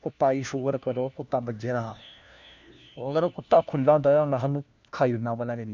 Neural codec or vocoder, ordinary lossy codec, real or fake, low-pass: codec, 16 kHz, 1 kbps, FunCodec, trained on LibriTTS, 50 frames a second; none; fake; none